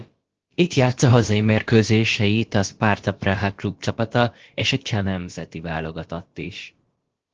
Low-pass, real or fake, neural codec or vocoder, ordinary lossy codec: 7.2 kHz; fake; codec, 16 kHz, about 1 kbps, DyCAST, with the encoder's durations; Opus, 16 kbps